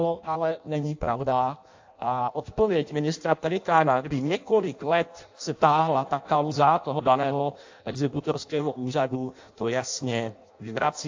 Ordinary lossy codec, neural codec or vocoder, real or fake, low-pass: AAC, 48 kbps; codec, 16 kHz in and 24 kHz out, 0.6 kbps, FireRedTTS-2 codec; fake; 7.2 kHz